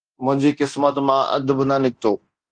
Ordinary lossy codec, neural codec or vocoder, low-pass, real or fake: Opus, 32 kbps; codec, 24 kHz, 0.9 kbps, DualCodec; 9.9 kHz; fake